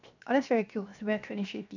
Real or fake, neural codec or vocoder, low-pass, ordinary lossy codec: fake; codec, 16 kHz, 0.7 kbps, FocalCodec; 7.2 kHz; none